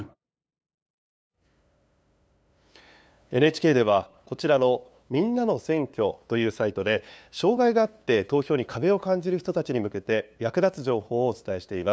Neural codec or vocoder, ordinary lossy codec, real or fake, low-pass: codec, 16 kHz, 2 kbps, FunCodec, trained on LibriTTS, 25 frames a second; none; fake; none